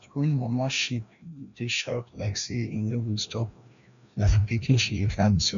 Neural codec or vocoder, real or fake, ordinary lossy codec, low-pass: codec, 16 kHz, 1 kbps, FreqCodec, larger model; fake; none; 7.2 kHz